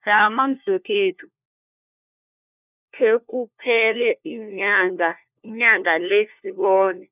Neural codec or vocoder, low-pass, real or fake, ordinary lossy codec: codec, 16 kHz, 1 kbps, FunCodec, trained on LibriTTS, 50 frames a second; 3.6 kHz; fake; none